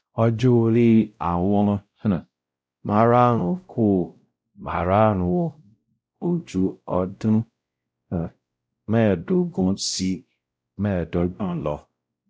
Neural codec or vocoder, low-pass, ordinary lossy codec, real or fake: codec, 16 kHz, 0.5 kbps, X-Codec, WavLM features, trained on Multilingual LibriSpeech; none; none; fake